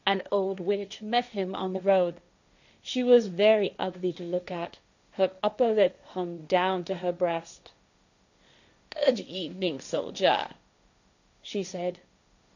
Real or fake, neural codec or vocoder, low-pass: fake; codec, 16 kHz, 1.1 kbps, Voila-Tokenizer; 7.2 kHz